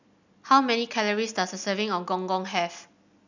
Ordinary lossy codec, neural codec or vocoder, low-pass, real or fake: none; none; 7.2 kHz; real